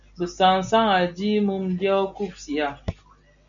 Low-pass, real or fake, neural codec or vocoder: 7.2 kHz; real; none